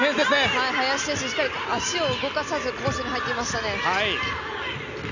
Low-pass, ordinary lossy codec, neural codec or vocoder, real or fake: 7.2 kHz; none; none; real